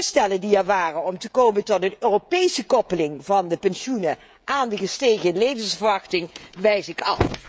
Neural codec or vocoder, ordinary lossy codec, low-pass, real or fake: codec, 16 kHz, 16 kbps, FreqCodec, smaller model; none; none; fake